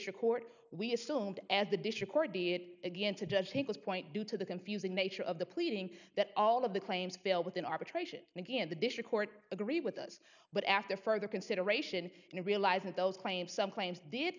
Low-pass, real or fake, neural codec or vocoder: 7.2 kHz; real; none